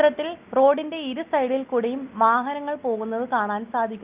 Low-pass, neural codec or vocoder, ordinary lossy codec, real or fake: 3.6 kHz; none; Opus, 24 kbps; real